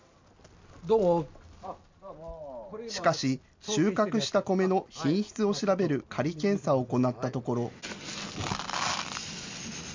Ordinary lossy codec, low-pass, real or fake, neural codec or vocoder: MP3, 64 kbps; 7.2 kHz; real; none